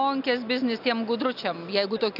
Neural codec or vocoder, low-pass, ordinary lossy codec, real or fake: none; 5.4 kHz; AAC, 48 kbps; real